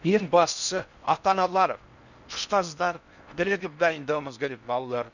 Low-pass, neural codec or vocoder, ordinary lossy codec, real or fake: 7.2 kHz; codec, 16 kHz in and 24 kHz out, 0.6 kbps, FocalCodec, streaming, 4096 codes; none; fake